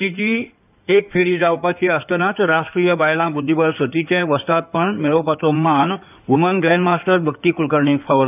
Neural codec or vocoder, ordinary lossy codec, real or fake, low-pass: codec, 16 kHz in and 24 kHz out, 2.2 kbps, FireRedTTS-2 codec; none; fake; 3.6 kHz